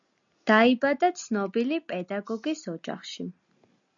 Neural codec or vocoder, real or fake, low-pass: none; real; 7.2 kHz